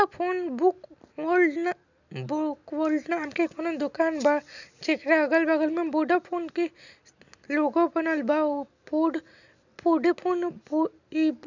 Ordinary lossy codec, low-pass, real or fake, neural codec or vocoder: none; 7.2 kHz; real; none